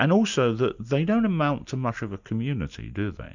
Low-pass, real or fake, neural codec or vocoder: 7.2 kHz; real; none